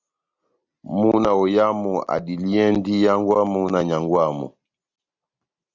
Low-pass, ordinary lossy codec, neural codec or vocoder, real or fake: 7.2 kHz; Opus, 64 kbps; none; real